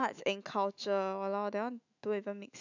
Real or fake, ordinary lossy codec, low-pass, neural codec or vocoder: fake; none; 7.2 kHz; autoencoder, 48 kHz, 128 numbers a frame, DAC-VAE, trained on Japanese speech